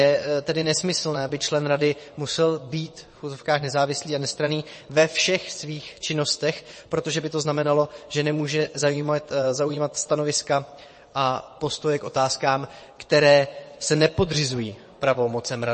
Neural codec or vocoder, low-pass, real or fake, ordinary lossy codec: vocoder, 22.05 kHz, 80 mel bands, WaveNeXt; 9.9 kHz; fake; MP3, 32 kbps